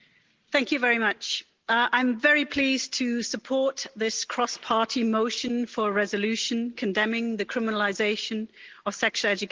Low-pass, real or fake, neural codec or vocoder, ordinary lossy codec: 7.2 kHz; real; none; Opus, 16 kbps